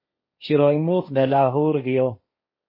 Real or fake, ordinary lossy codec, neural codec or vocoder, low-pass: fake; MP3, 24 kbps; codec, 16 kHz, 1.1 kbps, Voila-Tokenizer; 5.4 kHz